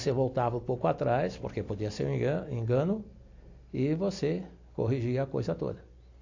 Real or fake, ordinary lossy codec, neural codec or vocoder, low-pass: real; AAC, 48 kbps; none; 7.2 kHz